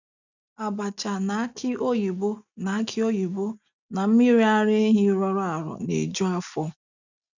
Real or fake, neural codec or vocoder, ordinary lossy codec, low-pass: real; none; none; 7.2 kHz